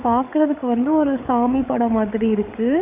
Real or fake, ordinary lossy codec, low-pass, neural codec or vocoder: fake; Opus, 64 kbps; 3.6 kHz; codec, 16 kHz, 8 kbps, FreqCodec, larger model